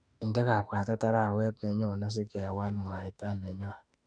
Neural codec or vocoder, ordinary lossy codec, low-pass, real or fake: autoencoder, 48 kHz, 32 numbers a frame, DAC-VAE, trained on Japanese speech; none; 9.9 kHz; fake